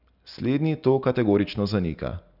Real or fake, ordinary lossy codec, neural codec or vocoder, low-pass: real; none; none; 5.4 kHz